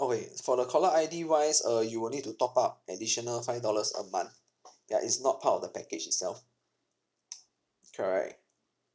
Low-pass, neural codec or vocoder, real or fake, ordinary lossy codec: none; none; real; none